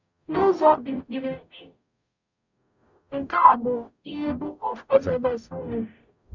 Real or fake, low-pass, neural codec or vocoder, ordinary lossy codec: fake; 7.2 kHz; codec, 44.1 kHz, 0.9 kbps, DAC; none